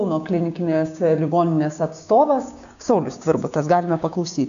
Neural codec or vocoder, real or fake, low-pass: codec, 16 kHz, 6 kbps, DAC; fake; 7.2 kHz